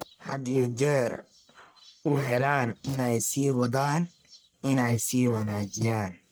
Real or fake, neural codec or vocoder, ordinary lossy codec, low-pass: fake; codec, 44.1 kHz, 1.7 kbps, Pupu-Codec; none; none